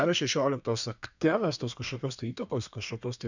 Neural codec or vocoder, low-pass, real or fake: codec, 32 kHz, 1.9 kbps, SNAC; 7.2 kHz; fake